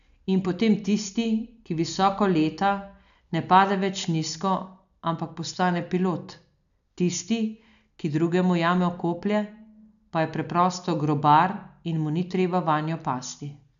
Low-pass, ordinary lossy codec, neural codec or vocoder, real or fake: 7.2 kHz; none; none; real